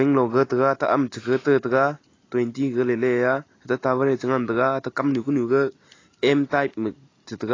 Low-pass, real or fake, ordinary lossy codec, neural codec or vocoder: 7.2 kHz; real; AAC, 32 kbps; none